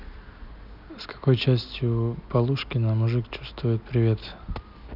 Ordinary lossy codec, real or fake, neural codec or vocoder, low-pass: none; real; none; 5.4 kHz